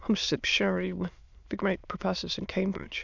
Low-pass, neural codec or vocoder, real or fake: 7.2 kHz; autoencoder, 22.05 kHz, a latent of 192 numbers a frame, VITS, trained on many speakers; fake